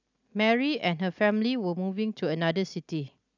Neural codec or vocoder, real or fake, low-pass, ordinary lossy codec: none; real; 7.2 kHz; none